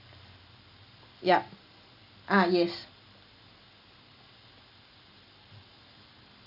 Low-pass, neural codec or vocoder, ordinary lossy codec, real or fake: 5.4 kHz; none; none; real